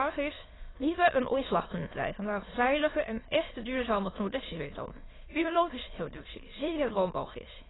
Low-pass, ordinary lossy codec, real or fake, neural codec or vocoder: 7.2 kHz; AAC, 16 kbps; fake; autoencoder, 22.05 kHz, a latent of 192 numbers a frame, VITS, trained on many speakers